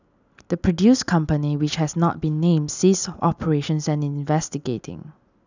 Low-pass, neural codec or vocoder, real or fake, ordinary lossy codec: 7.2 kHz; none; real; none